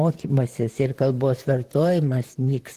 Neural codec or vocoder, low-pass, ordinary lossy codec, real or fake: vocoder, 44.1 kHz, 128 mel bands, Pupu-Vocoder; 14.4 kHz; Opus, 16 kbps; fake